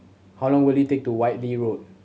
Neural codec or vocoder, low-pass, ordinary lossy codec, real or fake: none; none; none; real